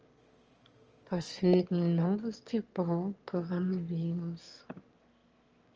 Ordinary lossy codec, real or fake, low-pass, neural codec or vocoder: Opus, 24 kbps; fake; 7.2 kHz; autoencoder, 22.05 kHz, a latent of 192 numbers a frame, VITS, trained on one speaker